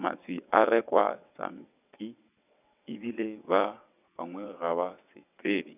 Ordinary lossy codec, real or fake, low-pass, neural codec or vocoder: none; fake; 3.6 kHz; vocoder, 22.05 kHz, 80 mel bands, WaveNeXt